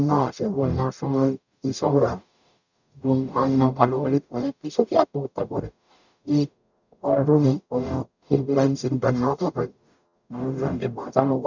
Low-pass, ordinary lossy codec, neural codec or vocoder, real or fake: 7.2 kHz; none; codec, 44.1 kHz, 0.9 kbps, DAC; fake